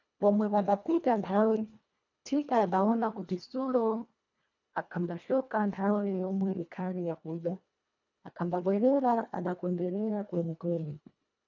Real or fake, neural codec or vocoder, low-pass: fake; codec, 24 kHz, 1.5 kbps, HILCodec; 7.2 kHz